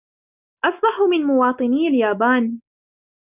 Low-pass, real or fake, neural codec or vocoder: 3.6 kHz; real; none